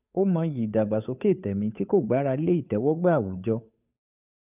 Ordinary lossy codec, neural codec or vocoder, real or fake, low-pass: none; codec, 16 kHz, 2 kbps, FunCodec, trained on Chinese and English, 25 frames a second; fake; 3.6 kHz